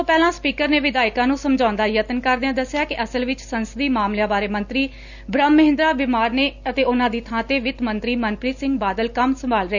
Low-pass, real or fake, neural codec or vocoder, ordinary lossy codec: 7.2 kHz; real; none; none